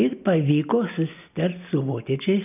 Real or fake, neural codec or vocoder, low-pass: real; none; 3.6 kHz